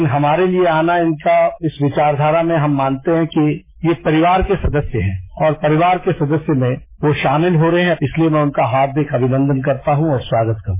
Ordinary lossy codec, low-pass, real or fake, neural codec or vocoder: MP3, 16 kbps; 3.6 kHz; fake; autoencoder, 48 kHz, 128 numbers a frame, DAC-VAE, trained on Japanese speech